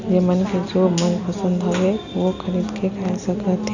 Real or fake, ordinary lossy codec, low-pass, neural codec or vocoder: real; none; 7.2 kHz; none